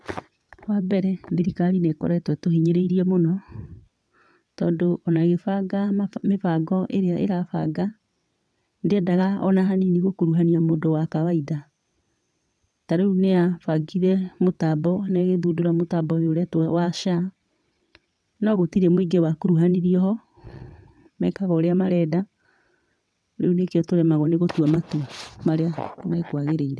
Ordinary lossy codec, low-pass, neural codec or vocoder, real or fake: none; none; vocoder, 22.05 kHz, 80 mel bands, WaveNeXt; fake